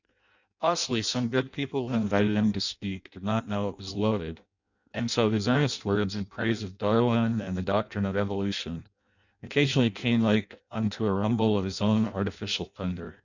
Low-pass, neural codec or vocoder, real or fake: 7.2 kHz; codec, 16 kHz in and 24 kHz out, 0.6 kbps, FireRedTTS-2 codec; fake